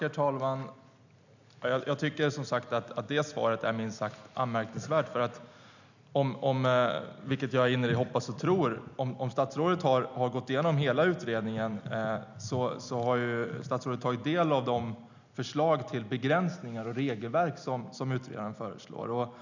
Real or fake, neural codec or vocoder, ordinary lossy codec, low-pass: real; none; none; 7.2 kHz